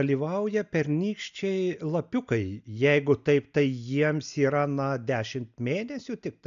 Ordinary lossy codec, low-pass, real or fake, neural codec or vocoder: AAC, 96 kbps; 7.2 kHz; real; none